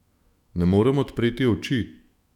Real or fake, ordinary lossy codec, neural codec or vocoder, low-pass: fake; none; autoencoder, 48 kHz, 128 numbers a frame, DAC-VAE, trained on Japanese speech; 19.8 kHz